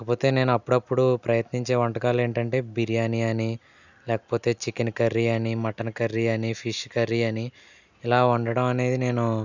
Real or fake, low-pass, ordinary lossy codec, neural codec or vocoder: real; 7.2 kHz; none; none